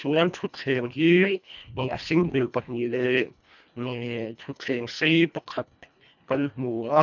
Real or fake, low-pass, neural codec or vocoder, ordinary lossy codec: fake; 7.2 kHz; codec, 24 kHz, 1.5 kbps, HILCodec; none